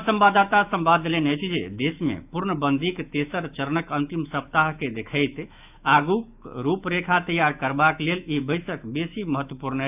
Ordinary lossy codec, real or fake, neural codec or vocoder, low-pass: none; fake; autoencoder, 48 kHz, 128 numbers a frame, DAC-VAE, trained on Japanese speech; 3.6 kHz